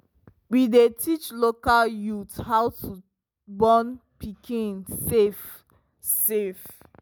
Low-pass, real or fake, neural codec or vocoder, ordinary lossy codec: none; real; none; none